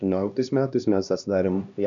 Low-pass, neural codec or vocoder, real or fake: 7.2 kHz; codec, 16 kHz, 1 kbps, X-Codec, HuBERT features, trained on LibriSpeech; fake